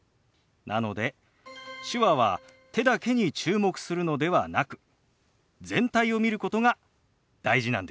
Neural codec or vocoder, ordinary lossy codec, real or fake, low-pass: none; none; real; none